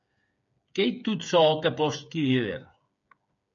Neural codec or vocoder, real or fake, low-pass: codec, 16 kHz, 8 kbps, FreqCodec, smaller model; fake; 7.2 kHz